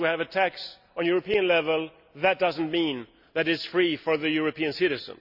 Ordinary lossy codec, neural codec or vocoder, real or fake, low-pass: none; none; real; 5.4 kHz